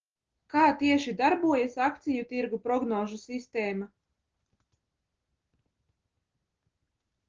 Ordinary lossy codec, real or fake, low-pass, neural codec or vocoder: Opus, 16 kbps; real; 7.2 kHz; none